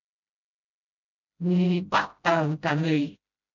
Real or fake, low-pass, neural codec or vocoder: fake; 7.2 kHz; codec, 16 kHz, 0.5 kbps, FreqCodec, smaller model